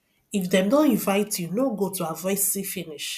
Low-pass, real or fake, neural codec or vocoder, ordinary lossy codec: 14.4 kHz; real; none; none